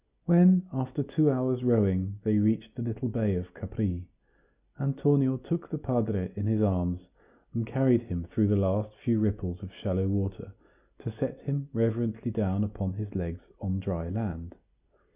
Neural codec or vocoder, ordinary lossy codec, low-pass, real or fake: none; Opus, 64 kbps; 3.6 kHz; real